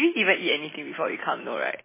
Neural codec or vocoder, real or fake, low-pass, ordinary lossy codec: none; real; 3.6 kHz; MP3, 16 kbps